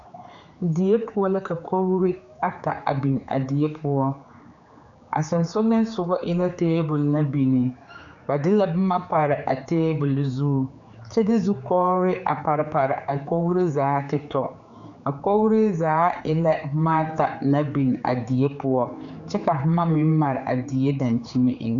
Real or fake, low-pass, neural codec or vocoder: fake; 7.2 kHz; codec, 16 kHz, 4 kbps, X-Codec, HuBERT features, trained on general audio